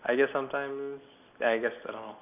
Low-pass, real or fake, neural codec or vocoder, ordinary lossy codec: 3.6 kHz; real; none; none